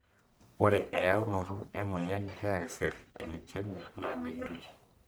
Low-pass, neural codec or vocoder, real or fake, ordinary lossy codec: none; codec, 44.1 kHz, 1.7 kbps, Pupu-Codec; fake; none